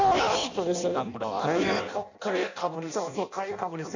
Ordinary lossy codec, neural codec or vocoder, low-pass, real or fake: none; codec, 16 kHz in and 24 kHz out, 0.6 kbps, FireRedTTS-2 codec; 7.2 kHz; fake